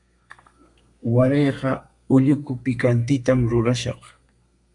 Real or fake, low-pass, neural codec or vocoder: fake; 10.8 kHz; codec, 32 kHz, 1.9 kbps, SNAC